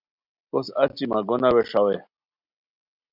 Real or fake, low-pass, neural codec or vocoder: real; 5.4 kHz; none